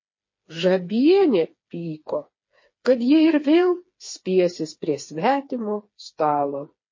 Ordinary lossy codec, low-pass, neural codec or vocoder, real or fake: MP3, 32 kbps; 7.2 kHz; codec, 16 kHz, 4 kbps, FreqCodec, smaller model; fake